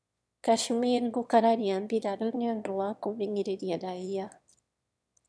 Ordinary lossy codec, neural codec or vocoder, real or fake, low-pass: none; autoencoder, 22.05 kHz, a latent of 192 numbers a frame, VITS, trained on one speaker; fake; none